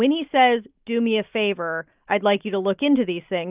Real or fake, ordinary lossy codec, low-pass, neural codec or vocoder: real; Opus, 24 kbps; 3.6 kHz; none